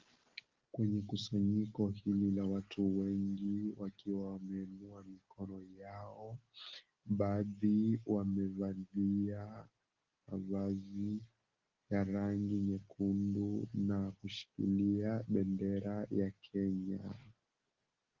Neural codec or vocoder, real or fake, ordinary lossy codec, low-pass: none; real; Opus, 16 kbps; 7.2 kHz